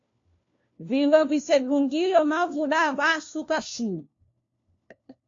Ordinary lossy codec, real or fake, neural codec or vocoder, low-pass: AAC, 48 kbps; fake; codec, 16 kHz, 1 kbps, FunCodec, trained on LibriTTS, 50 frames a second; 7.2 kHz